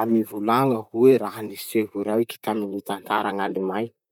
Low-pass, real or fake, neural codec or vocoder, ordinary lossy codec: 19.8 kHz; fake; vocoder, 44.1 kHz, 128 mel bands, Pupu-Vocoder; none